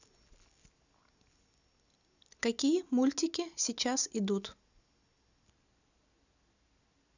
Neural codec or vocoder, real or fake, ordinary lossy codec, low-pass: none; real; none; 7.2 kHz